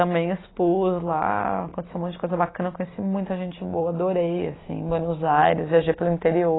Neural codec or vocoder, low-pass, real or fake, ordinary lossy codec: none; 7.2 kHz; real; AAC, 16 kbps